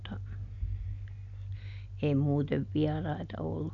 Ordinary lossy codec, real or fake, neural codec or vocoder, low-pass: none; real; none; 7.2 kHz